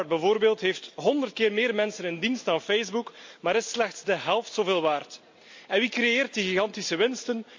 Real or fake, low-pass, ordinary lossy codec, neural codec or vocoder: real; 7.2 kHz; MP3, 48 kbps; none